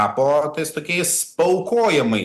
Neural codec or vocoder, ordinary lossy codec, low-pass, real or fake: none; Opus, 64 kbps; 14.4 kHz; real